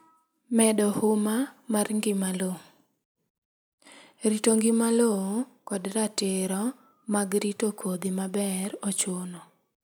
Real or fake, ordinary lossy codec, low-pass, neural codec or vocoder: real; none; none; none